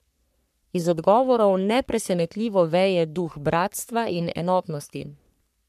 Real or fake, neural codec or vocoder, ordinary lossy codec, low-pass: fake; codec, 44.1 kHz, 3.4 kbps, Pupu-Codec; none; 14.4 kHz